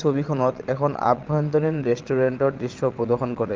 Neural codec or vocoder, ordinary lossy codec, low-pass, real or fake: vocoder, 22.05 kHz, 80 mel bands, WaveNeXt; Opus, 24 kbps; 7.2 kHz; fake